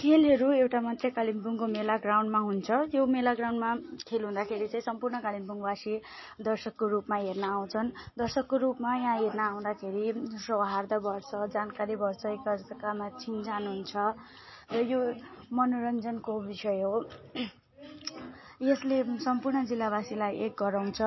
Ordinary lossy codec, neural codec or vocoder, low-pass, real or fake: MP3, 24 kbps; none; 7.2 kHz; real